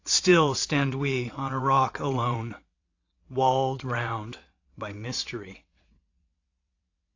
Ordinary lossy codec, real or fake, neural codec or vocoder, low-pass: AAC, 48 kbps; fake; vocoder, 22.05 kHz, 80 mel bands, WaveNeXt; 7.2 kHz